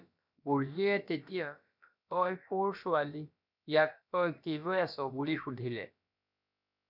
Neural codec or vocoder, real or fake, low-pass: codec, 16 kHz, about 1 kbps, DyCAST, with the encoder's durations; fake; 5.4 kHz